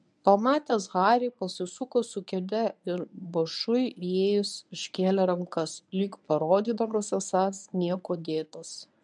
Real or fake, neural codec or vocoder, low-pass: fake; codec, 24 kHz, 0.9 kbps, WavTokenizer, medium speech release version 1; 10.8 kHz